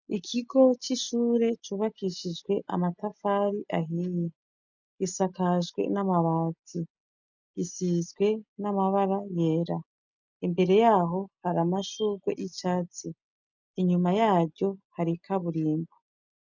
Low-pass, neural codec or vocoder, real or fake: 7.2 kHz; none; real